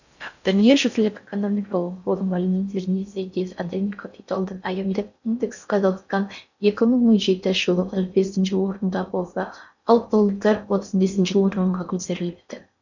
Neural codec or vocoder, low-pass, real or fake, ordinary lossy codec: codec, 16 kHz in and 24 kHz out, 0.6 kbps, FocalCodec, streaming, 4096 codes; 7.2 kHz; fake; none